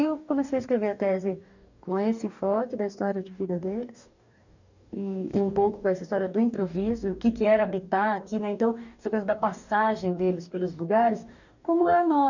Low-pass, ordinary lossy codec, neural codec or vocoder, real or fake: 7.2 kHz; none; codec, 44.1 kHz, 2.6 kbps, DAC; fake